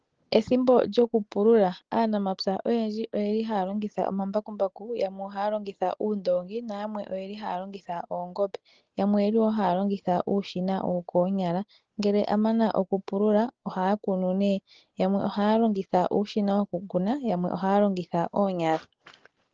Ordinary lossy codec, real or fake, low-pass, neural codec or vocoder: Opus, 16 kbps; real; 7.2 kHz; none